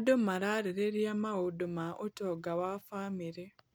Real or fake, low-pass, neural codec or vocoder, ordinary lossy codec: real; none; none; none